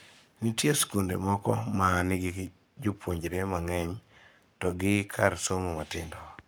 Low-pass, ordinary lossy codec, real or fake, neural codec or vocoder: none; none; fake; codec, 44.1 kHz, 7.8 kbps, Pupu-Codec